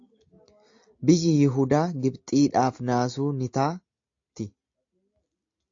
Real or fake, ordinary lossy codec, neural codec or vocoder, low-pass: real; AAC, 48 kbps; none; 7.2 kHz